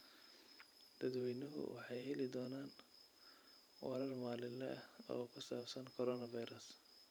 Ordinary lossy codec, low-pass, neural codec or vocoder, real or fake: none; none; vocoder, 44.1 kHz, 128 mel bands every 512 samples, BigVGAN v2; fake